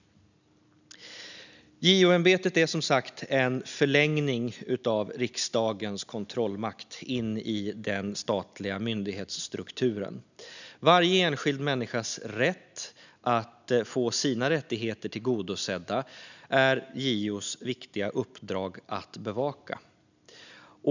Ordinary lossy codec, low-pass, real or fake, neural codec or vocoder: none; 7.2 kHz; real; none